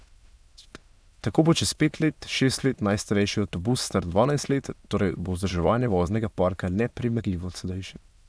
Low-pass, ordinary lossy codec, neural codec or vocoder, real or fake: none; none; autoencoder, 22.05 kHz, a latent of 192 numbers a frame, VITS, trained on many speakers; fake